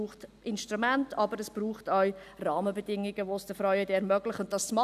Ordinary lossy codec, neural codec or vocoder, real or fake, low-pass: none; none; real; 14.4 kHz